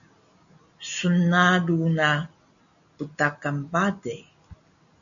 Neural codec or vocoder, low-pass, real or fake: none; 7.2 kHz; real